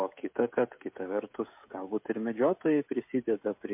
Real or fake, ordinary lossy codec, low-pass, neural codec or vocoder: fake; MP3, 32 kbps; 3.6 kHz; codec, 16 kHz, 16 kbps, FreqCodec, smaller model